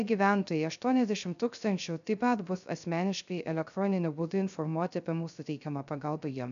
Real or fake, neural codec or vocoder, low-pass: fake; codec, 16 kHz, 0.3 kbps, FocalCodec; 7.2 kHz